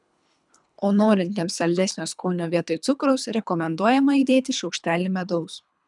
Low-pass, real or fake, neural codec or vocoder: 10.8 kHz; fake; codec, 24 kHz, 3 kbps, HILCodec